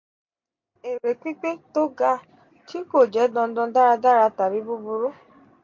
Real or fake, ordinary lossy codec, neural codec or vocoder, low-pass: real; MP3, 48 kbps; none; 7.2 kHz